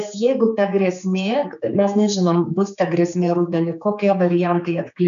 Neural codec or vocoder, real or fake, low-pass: codec, 16 kHz, 4 kbps, X-Codec, HuBERT features, trained on general audio; fake; 7.2 kHz